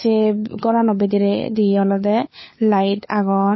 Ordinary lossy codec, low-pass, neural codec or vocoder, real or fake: MP3, 24 kbps; 7.2 kHz; codec, 16 kHz, 4 kbps, FunCodec, trained on LibriTTS, 50 frames a second; fake